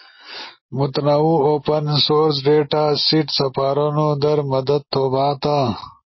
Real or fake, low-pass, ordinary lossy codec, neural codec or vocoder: real; 7.2 kHz; MP3, 24 kbps; none